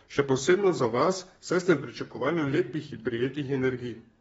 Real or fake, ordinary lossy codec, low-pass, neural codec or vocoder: fake; AAC, 24 kbps; 14.4 kHz; codec, 32 kHz, 1.9 kbps, SNAC